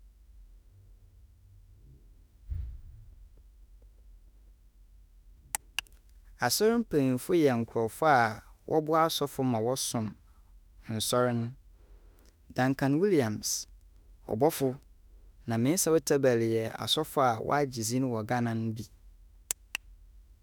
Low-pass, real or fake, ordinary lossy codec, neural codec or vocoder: none; fake; none; autoencoder, 48 kHz, 32 numbers a frame, DAC-VAE, trained on Japanese speech